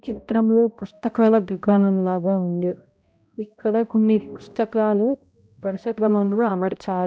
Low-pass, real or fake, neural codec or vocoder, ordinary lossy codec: none; fake; codec, 16 kHz, 0.5 kbps, X-Codec, HuBERT features, trained on balanced general audio; none